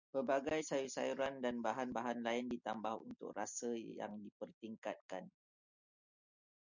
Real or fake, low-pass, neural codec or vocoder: real; 7.2 kHz; none